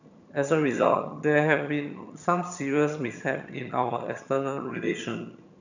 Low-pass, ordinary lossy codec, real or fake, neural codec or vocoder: 7.2 kHz; none; fake; vocoder, 22.05 kHz, 80 mel bands, HiFi-GAN